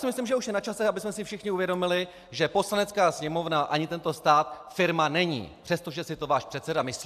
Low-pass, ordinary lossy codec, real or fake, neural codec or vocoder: 14.4 kHz; AAC, 96 kbps; real; none